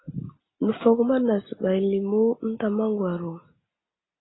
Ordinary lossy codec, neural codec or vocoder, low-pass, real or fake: AAC, 16 kbps; none; 7.2 kHz; real